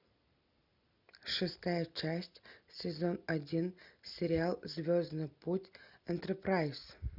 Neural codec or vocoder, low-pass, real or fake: none; 5.4 kHz; real